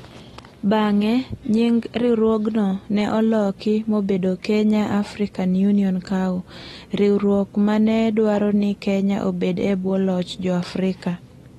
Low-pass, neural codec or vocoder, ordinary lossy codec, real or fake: 19.8 kHz; none; AAC, 32 kbps; real